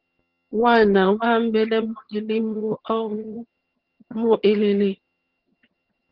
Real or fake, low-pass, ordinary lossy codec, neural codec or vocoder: fake; 5.4 kHz; Opus, 16 kbps; vocoder, 22.05 kHz, 80 mel bands, HiFi-GAN